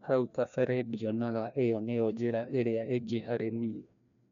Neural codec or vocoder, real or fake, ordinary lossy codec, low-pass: codec, 16 kHz, 1 kbps, FreqCodec, larger model; fake; none; 7.2 kHz